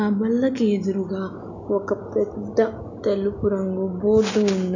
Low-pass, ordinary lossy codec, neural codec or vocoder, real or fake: 7.2 kHz; none; none; real